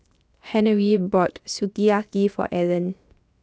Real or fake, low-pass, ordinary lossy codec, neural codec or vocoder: fake; none; none; codec, 16 kHz, 0.7 kbps, FocalCodec